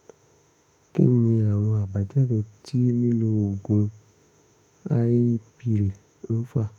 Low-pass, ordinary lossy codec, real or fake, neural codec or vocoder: 19.8 kHz; none; fake; autoencoder, 48 kHz, 32 numbers a frame, DAC-VAE, trained on Japanese speech